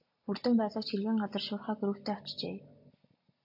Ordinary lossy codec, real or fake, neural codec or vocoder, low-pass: AAC, 48 kbps; real; none; 5.4 kHz